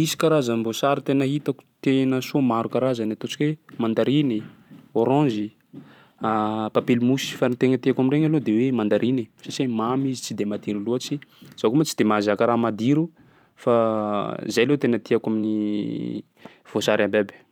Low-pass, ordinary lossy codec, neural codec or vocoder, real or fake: 19.8 kHz; none; vocoder, 44.1 kHz, 128 mel bands every 512 samples, BigVGAN v2; fake